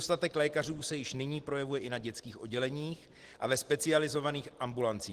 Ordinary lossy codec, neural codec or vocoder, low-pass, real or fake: Opus, 16 kbps; none; 14.4 kHz; real